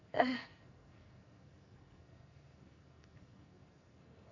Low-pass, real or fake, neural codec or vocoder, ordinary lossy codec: 7.2 kHz; fake; codec, 44.1 kHz, 2.6 kbps, SNAC; none